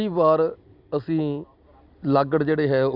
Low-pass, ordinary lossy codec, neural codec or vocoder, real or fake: 5.4 kHz; Opus, 64 kbps; none; real